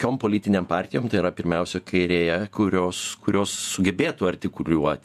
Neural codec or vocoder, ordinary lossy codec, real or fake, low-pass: vocoder, 48 kHz, 128 mel bands, Vocos; MP3, 96 kbps; fake; 14.4 kHz